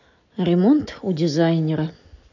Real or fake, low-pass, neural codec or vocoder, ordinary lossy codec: real; 7.2 kHz; none; none